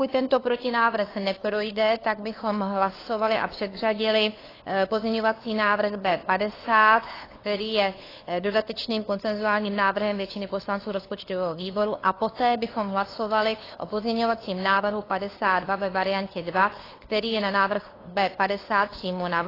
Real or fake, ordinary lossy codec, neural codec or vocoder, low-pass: fake; AAC, 24 kbps; codec, 16 kHz, 2 kbps, FunCodec, trained on LibriTTS, 25 frames a second; 5.4 kHz